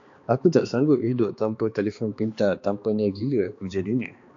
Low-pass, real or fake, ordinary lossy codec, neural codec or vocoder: 7.2 kHz; fake; AAC, 48 kbps; codec, 16 kHz, 2 kbps, X-Codec, HuBERT features, trained on balanced general audio